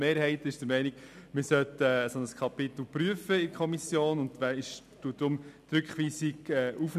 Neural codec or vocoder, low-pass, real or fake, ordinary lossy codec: none; 14.4 kHz; real; none